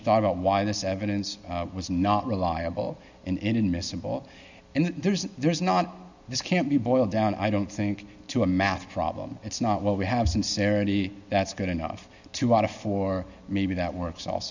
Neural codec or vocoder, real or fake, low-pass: none; real; 7.2 kHz